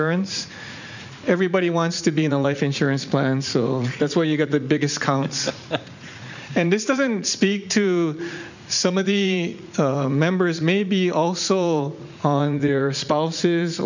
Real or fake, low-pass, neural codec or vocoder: fake; 7.2 kHz; vocoder, 44.1 kHz, 80 mel bands, Vocos